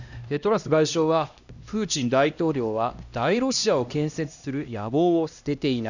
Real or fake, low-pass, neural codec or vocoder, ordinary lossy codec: fake; 7.2 kHz; codec, 16 kHz, 1 kbps, X-Codec, HuBERT features, trained on LibriSpeech; none